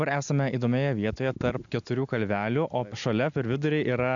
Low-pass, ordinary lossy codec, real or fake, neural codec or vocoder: 7.2 kHz; AAC, 64 kbps; real; none